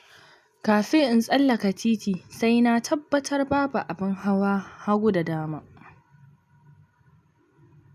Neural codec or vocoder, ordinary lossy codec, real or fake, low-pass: none; none; real; 14.4 kHz